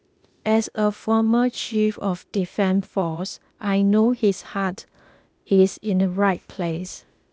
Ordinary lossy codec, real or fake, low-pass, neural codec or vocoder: none; fake; none; codec, 16 kHz, 0.8 kbps, ZipCodec